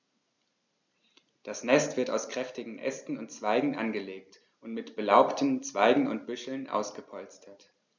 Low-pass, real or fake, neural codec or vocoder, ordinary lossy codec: 7.2 kHz; real; none; none